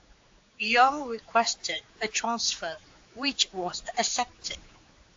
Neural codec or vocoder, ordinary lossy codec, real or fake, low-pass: codec, 16 kHz, 4 kbps, X-Codec, HuBERT features, trained on general audio; AAC, 48 kbps; fake; 7.2 kHz